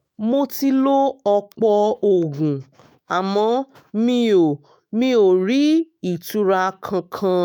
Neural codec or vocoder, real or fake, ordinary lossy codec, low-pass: autoencoder, 48 kHz, 128 numbers a frame, DAC-VAE, trained on Japanese speech; fake; none; none